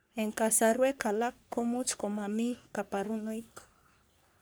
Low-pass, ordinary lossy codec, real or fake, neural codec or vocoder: none; none; fake; codec, 44.1 kHz, 3.4 kbps, Pupu-Codec